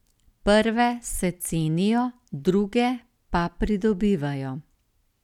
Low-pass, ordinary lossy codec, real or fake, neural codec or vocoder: 19.8 kHz; none; real; none